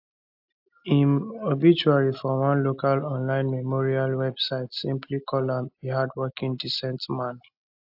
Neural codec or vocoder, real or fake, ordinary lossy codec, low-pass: none; real; AAC, 48 kbps; 5.4 kHz